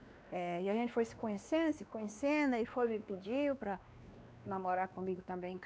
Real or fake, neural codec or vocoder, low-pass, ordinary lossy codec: fake; codec, 16 kHz, 2 kbps, X-Codec, WavLM features, trained on Multilingual LibriSpeech; none; none